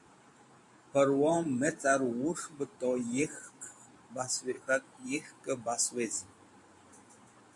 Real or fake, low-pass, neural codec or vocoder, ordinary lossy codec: fake; 10.8 kHz; vocoder, 44.1 kHz, 128 mel bands every 512 samples, BigVGAN v2; AAC, 64 kbps